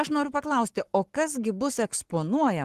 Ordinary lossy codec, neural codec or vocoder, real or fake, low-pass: Opus, 16 kbps; codec, 44.1 kHz, 7.8 kbps, Pupu-Codec; fake; 14.4 kHz